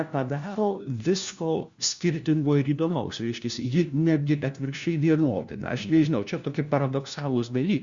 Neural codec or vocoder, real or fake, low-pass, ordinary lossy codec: codec, 16 kHz, 1 kbps, FunCodec, trained on LibriTTS, 50 frames a second; fake; 7.2 kHz; Opus, 64 kbps